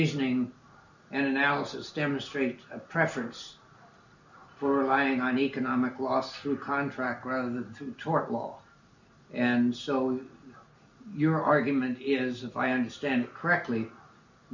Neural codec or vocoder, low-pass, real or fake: none; 7.2 kHz; real